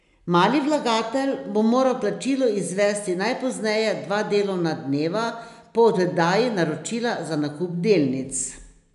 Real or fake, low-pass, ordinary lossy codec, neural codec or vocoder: real; 10.8 kHz; none; none